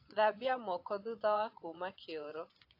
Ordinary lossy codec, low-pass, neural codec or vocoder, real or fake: AAC, 32 kbps; 5.4 kHz; vocoder, 24 kHz, 100 mel bands, Vocos; fake